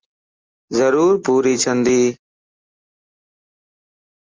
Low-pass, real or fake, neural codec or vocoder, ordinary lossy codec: 7.2 kHz; real; none; Opus, 32 kbps